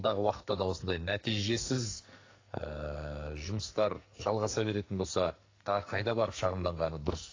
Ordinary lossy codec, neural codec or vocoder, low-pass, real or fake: AAC, 32 kbps; codec, 44.1 kHz, 2.6 kbps, SNAC; 7.2 kHz; fake